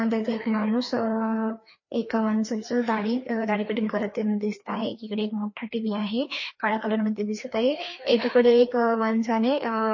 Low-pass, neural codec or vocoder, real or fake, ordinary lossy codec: 7.2 kHz; codec, 16 kHz, 2 kbps, FreqCodec, larger model; fake; MP3, 32 kbps